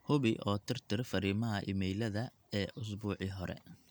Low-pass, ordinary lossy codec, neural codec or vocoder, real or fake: none; none; none; real